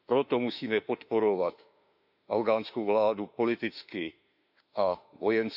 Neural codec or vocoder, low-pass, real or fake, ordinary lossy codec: autoencoder, 48 kHz, 32 numbers a frame, DAC-VAE, trained on Japanese speech; 5.4 kHz; fake; none